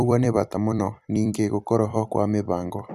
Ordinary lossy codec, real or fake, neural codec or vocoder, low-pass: none; fake; vocoder, 44.1 kHz, 128 mel bands every 256 samples, BigVGAN v2; 14.4 kHz